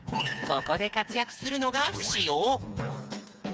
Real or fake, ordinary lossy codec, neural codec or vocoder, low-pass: fake; none; codec, 16 kHz, 4 kbps, FreqCodec, smaller model; none